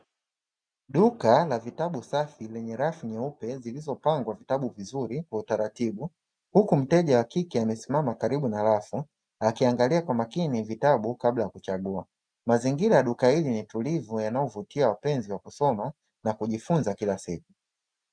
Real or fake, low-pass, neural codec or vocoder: real; 9.9 kHz; none